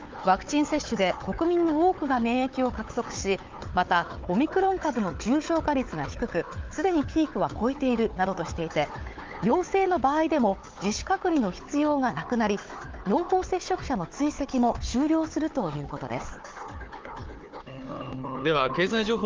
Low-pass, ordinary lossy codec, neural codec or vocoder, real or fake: 7.2 kHz; Opus, 32 kbps; codec, 16 kHz, 4 kbps, FunCodec, trained on Chinese and English, 50 frames a second; fake